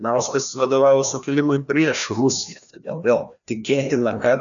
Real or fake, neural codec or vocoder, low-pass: fake; codec, 16 kHz, 1 kbps, FreqCodec, larger model; 7.2 kHz